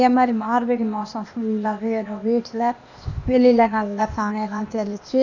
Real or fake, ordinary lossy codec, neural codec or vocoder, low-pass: fake; none; codec, 16 kHz, 0.8 kbps, ZipCodec; 7.2 kHz